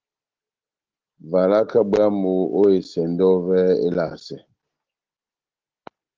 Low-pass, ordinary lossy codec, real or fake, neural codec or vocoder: 7.2 kHz; Opus, 16 kbps; real; none